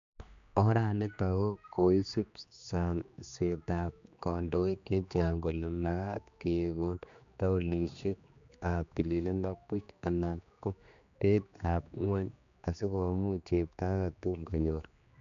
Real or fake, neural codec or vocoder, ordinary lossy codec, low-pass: fake; codec, 16 kHz, 2 kbps, X-Codec, HuBERT features, trained on general audio; MP3, 64 kbps; 7.2 kHz